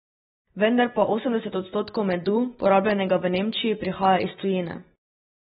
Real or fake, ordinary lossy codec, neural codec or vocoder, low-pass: real; AAC, 16 kbps; none; 7.2 kHz